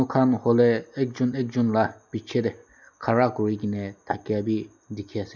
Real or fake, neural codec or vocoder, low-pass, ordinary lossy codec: real; none; 7.2 kHz; none